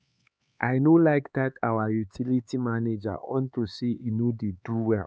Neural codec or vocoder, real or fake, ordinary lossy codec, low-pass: codec, 16 kHz, 4 kbps, X-Codec, HuBERT features, trained on LibriSpeech; fake; none; none